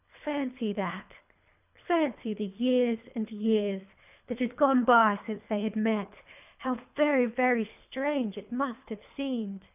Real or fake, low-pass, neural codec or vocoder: fake; 3.6 kHz; codec, 24 kHz, 3 kbps, HILCodec